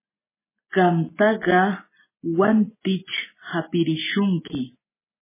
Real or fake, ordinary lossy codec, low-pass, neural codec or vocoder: real; MP3, 16 kbps; 3.6 kHz; none